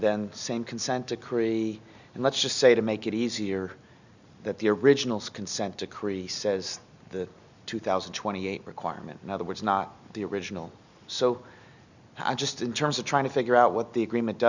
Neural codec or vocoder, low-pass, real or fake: none; 7.2 kHz; real